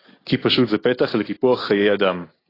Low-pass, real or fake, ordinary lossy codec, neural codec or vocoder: 5.4 kHz; real; AAC, 24 kbps; none